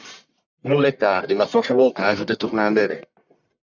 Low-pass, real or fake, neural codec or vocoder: 7.2 kHz; fake; codec, 44.1 kHz, 1.7 kbps, Pupu-Codec